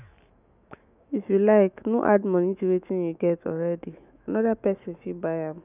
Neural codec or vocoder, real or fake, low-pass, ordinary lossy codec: autoencoder, 48 kHz, 128 numbers a frame, DAC-VAE, trained on Japanese speech; fake; 3.6 kHz; none